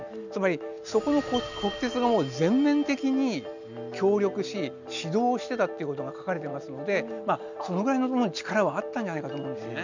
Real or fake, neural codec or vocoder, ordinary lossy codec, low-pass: real; none; none; 7.2 kHz